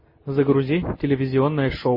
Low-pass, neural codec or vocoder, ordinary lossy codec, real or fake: 5.4 kHz; none; MP3, 24 kbps; real